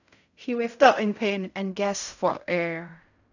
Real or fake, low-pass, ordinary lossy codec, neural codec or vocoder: fake; 7.2 kHz; none; codec, 16 kHz in and 24 kHz out, 0.4 kbps, LongCat-Audio-Codec, fine tuned four codebook decoder